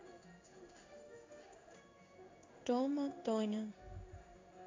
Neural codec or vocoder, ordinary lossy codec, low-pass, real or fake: codec, 16 kHz in and 24 kHz out, 1 kbps, XY-Tokenizer; AAC, 32 kbps; 7.2 kHz; fake